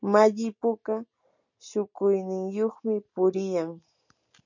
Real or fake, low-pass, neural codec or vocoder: real; 7.2 kHz; none